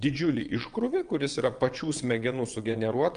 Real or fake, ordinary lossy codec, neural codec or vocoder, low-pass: fake; Opus, 32 kbps; vocoder, 22.05 kHz, 80 mel bands, WaveNeXt; 9.9 kHz